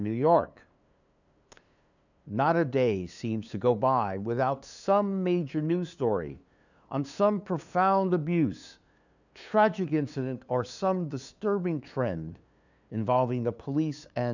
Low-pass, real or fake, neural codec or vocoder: 7.2 kHz; fake; codec, 16 kHz, 2 kbps, FunCodec, trained on LibriTTS, 25 frames a second